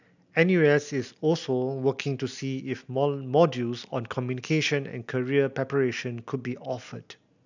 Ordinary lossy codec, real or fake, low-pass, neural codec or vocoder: none; real; 7.2 kHz; none